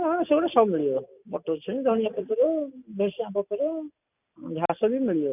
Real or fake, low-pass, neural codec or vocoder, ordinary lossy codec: real; 3.6 kHz; none; none